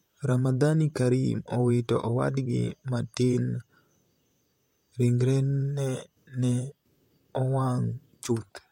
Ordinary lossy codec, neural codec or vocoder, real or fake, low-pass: MP3, 64 kbps; vocoder, 44.1 kHz, 128 mel bands, Pupu-Vocoder; fake; 19.8 kHz